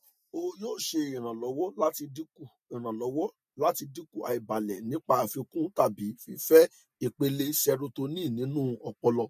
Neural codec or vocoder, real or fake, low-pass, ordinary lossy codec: none; real; 14.4 kHz; MP3, 64 kbps